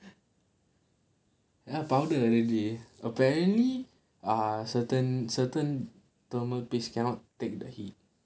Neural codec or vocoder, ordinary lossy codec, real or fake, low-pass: none; none; real; none